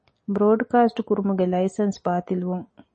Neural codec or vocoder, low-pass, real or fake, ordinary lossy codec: none; 10.8 kHz; real; MP3, 32 kbps